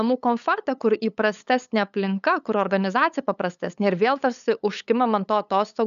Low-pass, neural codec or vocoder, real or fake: 7.2 kHz; codec, 16 kHz, 8 kbps, FunCodec, trained on LibriTTS, 25 frames a second; fake